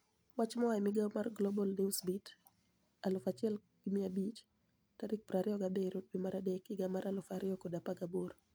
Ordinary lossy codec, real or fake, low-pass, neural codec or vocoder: none; real; none; none